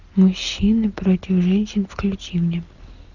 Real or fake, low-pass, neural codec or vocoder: real; 7.2 kHz; none